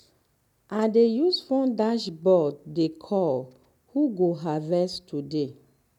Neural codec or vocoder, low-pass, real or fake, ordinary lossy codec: none; 19.8 kHz; real; none